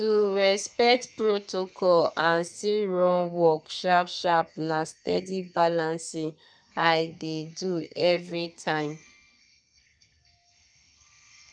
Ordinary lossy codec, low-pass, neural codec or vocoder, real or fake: none; 9.9 kHz; codec, 32 kHz, 1.9 kbps, SNAC; fake